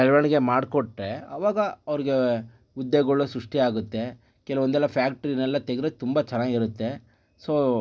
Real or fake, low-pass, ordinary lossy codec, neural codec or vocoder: real; none; none; none